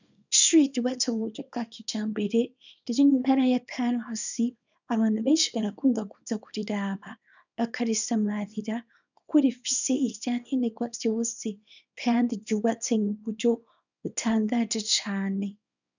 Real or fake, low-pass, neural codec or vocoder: fake; 7.2 kHz; codec, 24 kHz, 0.9 kbps, WavTokenizer, small release